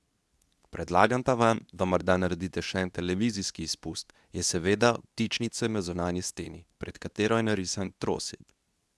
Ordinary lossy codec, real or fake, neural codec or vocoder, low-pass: none; fake; codec, 24 kHz, 0.9 kbps, WavTokenizer, medium speech release version 2; none